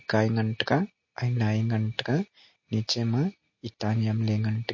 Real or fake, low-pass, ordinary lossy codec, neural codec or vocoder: real; 7.2 kHz; MP3, 32 kbps; none